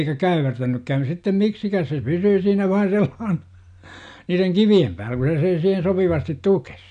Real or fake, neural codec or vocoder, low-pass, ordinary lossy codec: real; none; 9.9 kHz; none